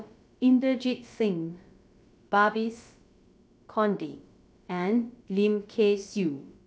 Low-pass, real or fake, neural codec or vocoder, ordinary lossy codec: none; fake; codec, 16 kHz, about 1 kbps, DyCAST, with the encoder's durations; none